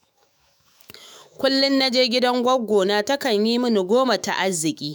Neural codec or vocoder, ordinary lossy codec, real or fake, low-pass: autoencoder, 48 kHz, 128 numbers a frame, DAC-VAE, trained on Japanese speech; none; fake; none